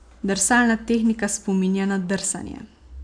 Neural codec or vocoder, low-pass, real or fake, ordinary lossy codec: none; 9.9 kHz; real; none